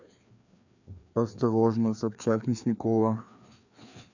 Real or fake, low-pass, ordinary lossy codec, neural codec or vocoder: fake; 7.2 kHz; none; codec, 16 kHz, 2 kbps, FreqCodec, larger model